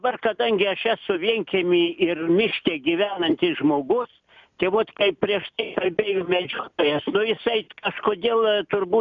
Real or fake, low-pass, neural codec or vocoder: real; 7.2 kHz; none